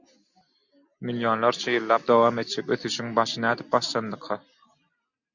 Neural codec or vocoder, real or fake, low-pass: none; real; 7.2 kHz